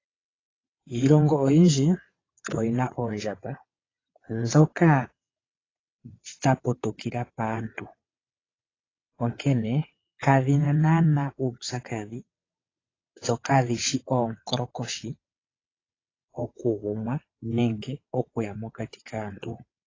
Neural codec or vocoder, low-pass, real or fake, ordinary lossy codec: vocoder, 22.05 kHz, 80 mel bands, WaveNeXt; 7.2 kHz; fake; AAC, 32 kbps